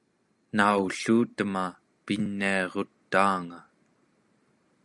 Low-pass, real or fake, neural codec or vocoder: 10.8 kHz; real; none